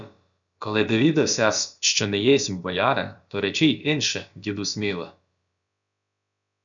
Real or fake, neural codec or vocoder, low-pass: fake; codec, 16 kHz, about 1 kbps, DyCAST, with the encoder's durations; 7.2 kHz